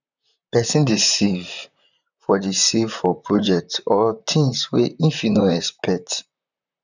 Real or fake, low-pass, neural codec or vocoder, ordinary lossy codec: fake; 7.2 kHz; vocoder, 44.1 kHz, 128 mel bands, Pupu-Vocoder; none